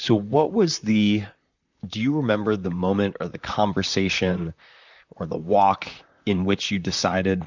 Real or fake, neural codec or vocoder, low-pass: fake; vocoder, 44.1 kHz, 128 mel bands, Pupu-Vocoder; 7.2 kHz